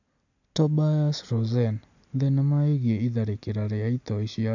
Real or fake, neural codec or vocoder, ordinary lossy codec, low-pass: real; none; AAC, 48 kbps; 7.2 kHz